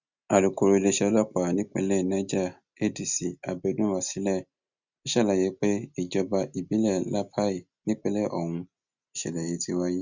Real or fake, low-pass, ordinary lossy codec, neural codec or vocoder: real; 7.2 kHz; Opus, 64 kbps; none